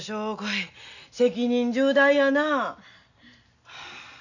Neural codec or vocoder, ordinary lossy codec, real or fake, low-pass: none; none; real; 7.2 kHz